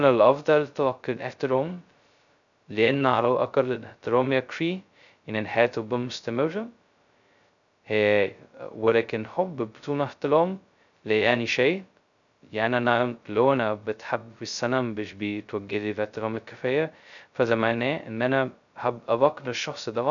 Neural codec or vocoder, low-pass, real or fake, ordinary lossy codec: codec, 16 kHz, 0.2 kbps, FocalCodec; 7.2 kHz; fake; none